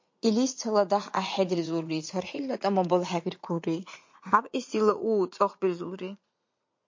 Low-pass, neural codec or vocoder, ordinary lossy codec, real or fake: 7.2 kHz; vocoder, 44.1 kHz, 80 mel bands, Vocos; MP3, 48 kbps; fake